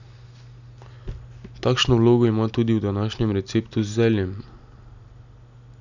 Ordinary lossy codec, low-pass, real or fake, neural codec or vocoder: none; 7.2 kHz; real; none